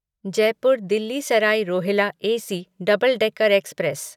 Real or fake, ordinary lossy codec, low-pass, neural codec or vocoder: real; none; 19.8 kHz; none